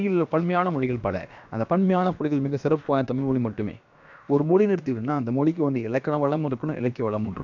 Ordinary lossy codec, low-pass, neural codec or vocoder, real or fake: none; 7.2 kHz; codec, 16 kHz, about 1 kbps, DyCAST, with the encoder's durations; fake